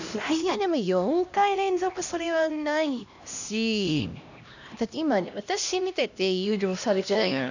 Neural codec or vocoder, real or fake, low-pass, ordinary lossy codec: codec, 16 kHz, 1 kbps, X-Codec, HuBERT features, trained on LibriSpeech; fake; 7.2 kHz; none